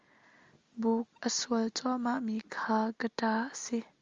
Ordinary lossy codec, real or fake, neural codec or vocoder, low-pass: Opus, 32 kbps; real; none; 7.2 kHz